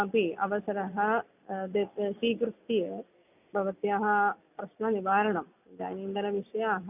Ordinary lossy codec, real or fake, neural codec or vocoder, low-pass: none; real; none; 3.6 kHz